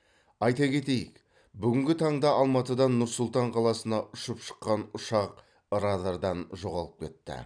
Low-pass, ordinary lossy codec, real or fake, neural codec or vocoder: 9.9 kHz; none; fake; vocoder, 44.1 kHz, 128 mel bands every 256 samples, BigVGAN v2